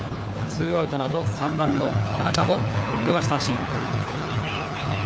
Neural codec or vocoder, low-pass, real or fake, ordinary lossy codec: codec, 16 kHz, 4 kbps, FunCodec, trained on LibriTTS, 50 frames a second; none; fake; none